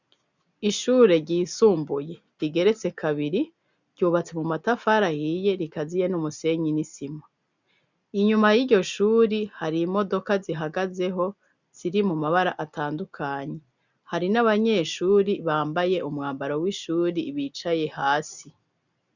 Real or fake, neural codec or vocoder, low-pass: real; none; 7.2 kHz